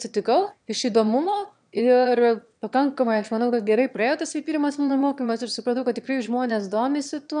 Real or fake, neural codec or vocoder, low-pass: fake; autoencoder, 22.05 kHz, a latent of 192 numbers a frame, VITS, trained on one speaker; 9.9 kHz